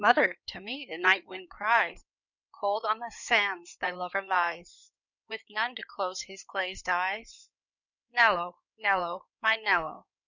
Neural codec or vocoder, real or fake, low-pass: codec, 16 kHz in and 24 kHz out, 2.2 kbps, FireRedTTS-2 codec; fake; 7.2 kHz